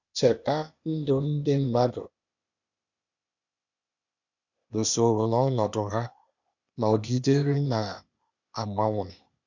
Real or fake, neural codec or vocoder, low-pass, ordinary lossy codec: fake; codec, 16 kHz, 0.8 kbps, ZipCodec; 7.2 kHz; none